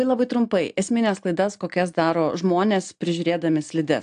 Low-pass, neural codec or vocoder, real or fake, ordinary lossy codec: 9.9 kHz; none; real; AAC, 64 kbps